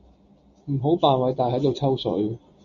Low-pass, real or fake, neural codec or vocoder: 7.2 kHz; real; none